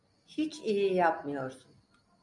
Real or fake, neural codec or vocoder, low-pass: real; none; 10.8 kHz